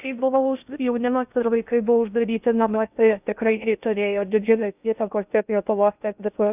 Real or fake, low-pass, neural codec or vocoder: fake; 3.6 kHz; codec, 16 kHz in and 24 kHz out, 0.6 kbps, FocalCodec, streaming, 2048 codes